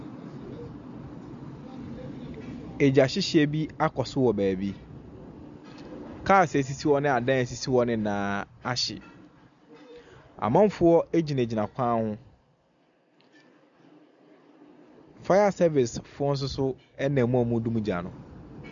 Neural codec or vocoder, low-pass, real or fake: none; 7.2 kHz; real